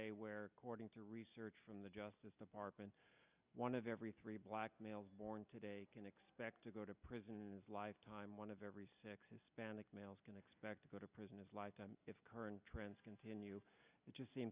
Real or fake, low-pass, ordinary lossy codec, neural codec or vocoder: real; 3.6 kHz; Opus, 64 kbps; none